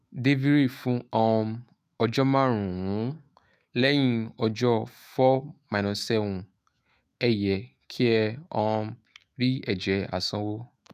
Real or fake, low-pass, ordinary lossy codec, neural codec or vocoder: fake; 14.4 kHz; none; autoencoder, 48 kHz, 128 numbers a frame, DAC-VAE, trained on Japanese speech